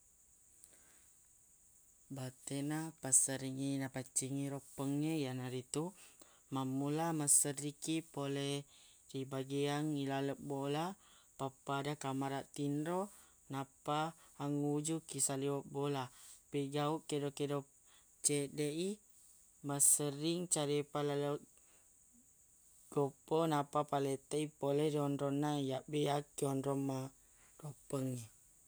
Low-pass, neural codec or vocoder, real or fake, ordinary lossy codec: none; none; real; none